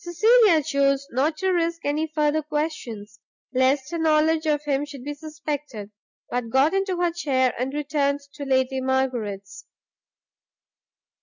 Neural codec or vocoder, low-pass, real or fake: none; 7.2 kHz; real